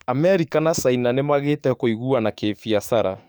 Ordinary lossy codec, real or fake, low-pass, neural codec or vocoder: none; fake; none; codec, 44.1 kHz, 7.8 kbps, DAC